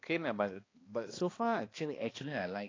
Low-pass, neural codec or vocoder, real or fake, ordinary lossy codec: 7.2 kHz; codec, 16 kHz, 2 kbps, X-Codec, HuBERT features, trained on balanced general audio; fake; AAC, 32 kbps